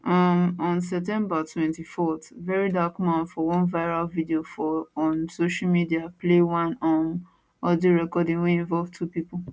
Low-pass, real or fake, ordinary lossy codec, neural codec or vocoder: none; real; none; none